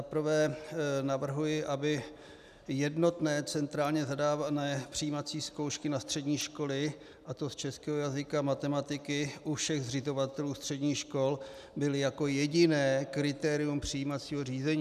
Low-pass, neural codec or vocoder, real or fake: 14.4 kHz; none; real